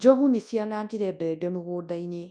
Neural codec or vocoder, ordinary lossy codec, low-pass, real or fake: codec, 24 kHz, 0.9 kbps, WavTokenizer, large speech release; none; 9.9 kHz; fake